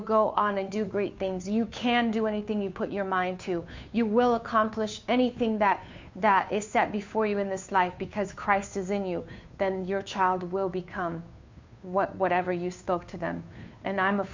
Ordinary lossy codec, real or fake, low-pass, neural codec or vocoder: MP3, 64 kbps; fake; 7.2 kHz; codec, 16 kHz, 2 kbps, FunCodec, trained on Chinese and English, 25 frames a second